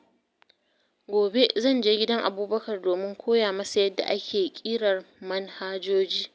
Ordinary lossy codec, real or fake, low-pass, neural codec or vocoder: none; real; none; none